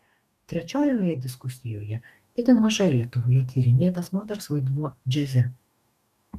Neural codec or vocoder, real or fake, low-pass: codec, 44.1 kHz, 2.6 kbps, DAC; fake; 14.4 kHz